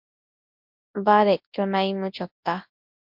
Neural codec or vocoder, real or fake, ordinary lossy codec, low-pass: codec, 24 kHz, 0.9 kbps, WavTokenizer, large speech release; fake; MP3, 48 kbps; 5.4 kHz